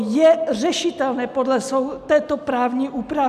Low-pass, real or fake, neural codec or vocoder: 14.4 kHz; real; none